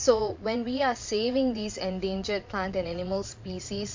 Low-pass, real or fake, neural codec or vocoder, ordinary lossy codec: 7.2 kHz; fake; vocoder, 22.05 kHz, 80 mel bands, Vocos; MP3, 48 kbps